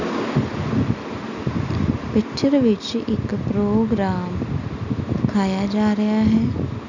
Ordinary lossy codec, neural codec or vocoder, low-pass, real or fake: none; none; 7.2 kHz; real